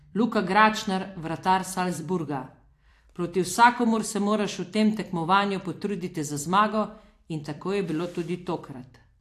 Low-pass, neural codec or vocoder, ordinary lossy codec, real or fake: 14.4 kHz; vocoder, 44.1 kHz, 128 mel bands every 256 samples, BigVGAN v2; AAC, 64 kbps; fake